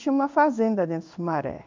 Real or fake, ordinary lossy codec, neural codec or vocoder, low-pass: fake; MP3, 64 kbps; codec, 16 kHz in and 24 kHz out, 1 kbps, XY-Tokenizer; 7.2 kHz